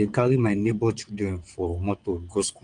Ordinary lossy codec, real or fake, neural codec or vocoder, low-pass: Opus, 32 kbps; fake; vocoder, 22.05 kHz, 80 mel bands, WaveNeXt; 9.9 kHz